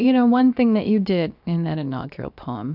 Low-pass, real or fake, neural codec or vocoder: 5.4 kHz; fake; codec, 16 kHz, about 1 kbps, DyCAST, with the encoder's durations